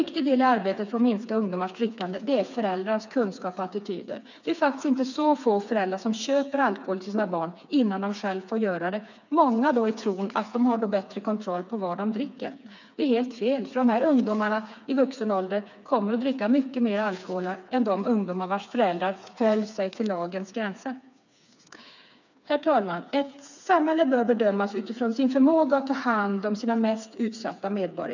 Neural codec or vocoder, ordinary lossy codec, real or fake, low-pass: codec, 16 kHz, 4 kbps, FreqCodec, smaller model; none; fake; 7.2 kHz